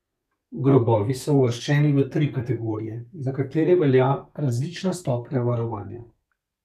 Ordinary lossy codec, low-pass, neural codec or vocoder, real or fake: none; 14.4 kHz; codec, 32 kHz, 1.9 kbps, SNAC; fake